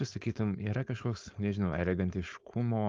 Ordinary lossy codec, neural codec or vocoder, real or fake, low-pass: Opus, 32 kbps; codec, 16 kHz, 4.8 kbps, FACodec; fake; 7.2 kHz